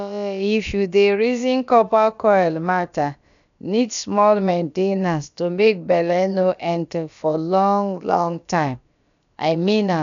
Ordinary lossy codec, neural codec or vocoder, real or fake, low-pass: none; codec, 16 kHz, about 1 kbps, DyCAST, with the encoder's durations; fake; 7.2 kHz